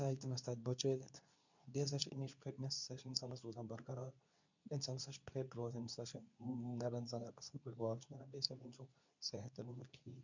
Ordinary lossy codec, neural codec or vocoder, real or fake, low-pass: none; codec, 24 kHz, 0.9 kbps, WavTokenizer, medium speech release version 2; fake; 7.2 kHz